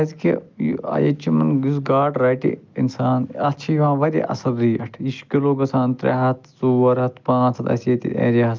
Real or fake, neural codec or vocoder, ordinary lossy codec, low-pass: real; none; Opus, 24 kbps; 7.2 kHz